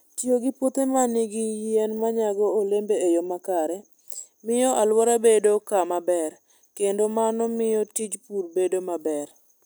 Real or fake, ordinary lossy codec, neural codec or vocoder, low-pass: real; none; none; none